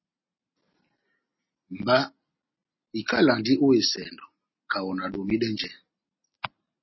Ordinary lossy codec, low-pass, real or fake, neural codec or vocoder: MP3, 24 kbps; 7.2 kHz; real; none